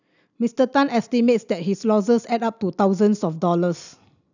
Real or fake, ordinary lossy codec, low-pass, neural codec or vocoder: real; none; 7.2 kHz; none